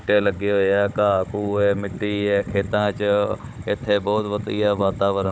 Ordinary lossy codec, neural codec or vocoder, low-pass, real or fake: none; codec, 16 kHz, 16 kbps, FunCodec, trained on Chinese and English, 50 frames a second; none; fake